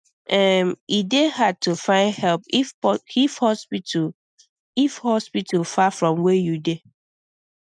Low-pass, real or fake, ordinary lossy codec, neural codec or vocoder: 9.9 kHz; real; none; none